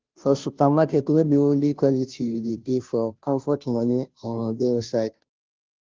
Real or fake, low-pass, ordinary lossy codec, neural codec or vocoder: fake; 7.2 kHz; Opus, 32 kbps; codec, 16 kHz, 0.5 kbps, FunCodec, trained on Chinese and English, 25 frames a second